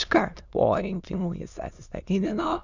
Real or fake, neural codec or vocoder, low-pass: fake; autoencoder, 22.05 kHz, a latent of 192 numbers a frame, VITS, trained on many speakers; 7.2 kHz